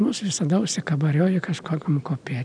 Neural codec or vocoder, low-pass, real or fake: none; 9.9 kHz; real